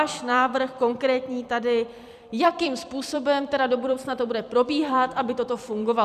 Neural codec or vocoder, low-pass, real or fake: vocoder, 44.1 kHz, 128 mel bands every 256 samples, BigVGAN v2; 14.4 kHz; fake